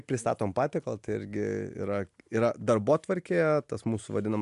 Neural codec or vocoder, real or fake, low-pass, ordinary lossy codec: none; real; 10.8 kHz; MP3, 64 kbps